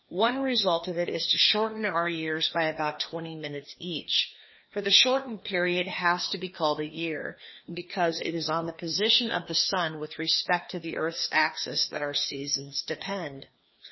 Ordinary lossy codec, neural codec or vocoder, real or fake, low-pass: MP3, 24 kbps; codec, 16 kHz, 2 kbps, FreqCodec, larger model; fake; 7.2 kHz